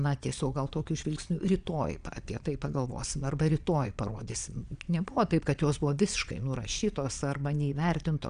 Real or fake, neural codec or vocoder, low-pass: fake; vocoder, 22.05 kHz, 80 mel bands, Vocos; 9.9 kHz